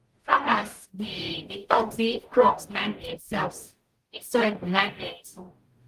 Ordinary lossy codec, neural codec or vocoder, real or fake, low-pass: Opus, 24 kbps; codec, 44.1 kHz, 0.9 kbps, DAC; fake; 14.4 kHz